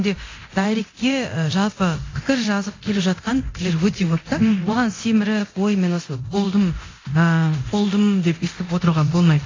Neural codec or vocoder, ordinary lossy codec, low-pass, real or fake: codec, 24 kHz, 0.9 kbps, DualCodec; AAC, 32 kbps; 7.2 kHz; fake